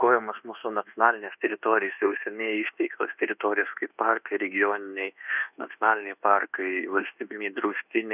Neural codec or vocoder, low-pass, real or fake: codec, 24 kHz, 1.2 kbps, DualCodec; 3.6 kHz; fake